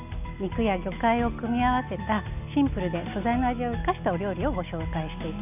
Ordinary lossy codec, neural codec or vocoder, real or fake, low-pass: none; none; real; 3.6 kHz